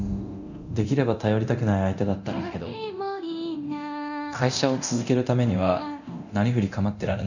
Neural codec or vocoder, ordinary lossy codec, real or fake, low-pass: codec, 24 kHz, 0.9 kbps, DualCodec; none; fake; 7.2 kHz